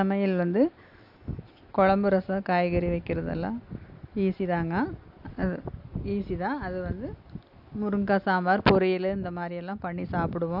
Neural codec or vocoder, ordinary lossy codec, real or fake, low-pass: none; AAC, 48 kbps; real; 5.4 kHz